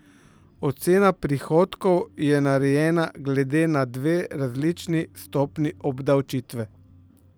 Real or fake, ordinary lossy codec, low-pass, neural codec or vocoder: fake; none; none; vocoder, 44.1 kHz, 128 mel bands every 512 samples, BigVGAN v2